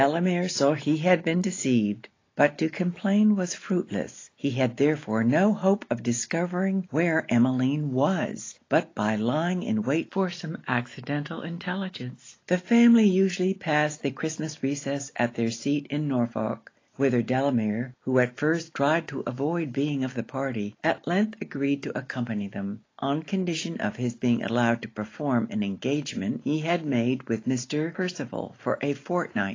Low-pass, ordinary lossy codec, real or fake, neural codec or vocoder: 7.2 kHz; AAC, 32 kbps; real; none